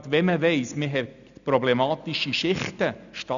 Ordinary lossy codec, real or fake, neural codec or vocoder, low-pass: none; real; none; 7.2 kHz